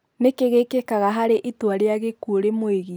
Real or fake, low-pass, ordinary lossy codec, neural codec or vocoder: real; none; none; none